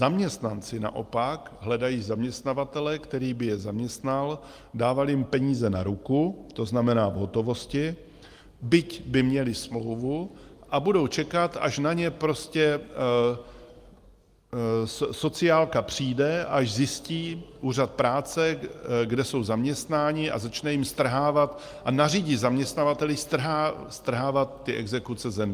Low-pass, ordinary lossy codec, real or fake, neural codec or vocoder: 14.4 kHz; Opus, 32 kbps; real; none